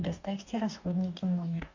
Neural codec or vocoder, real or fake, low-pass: codec, 44.1 kHz, 2.6 kbps, DAC; fake; 7.2 kHz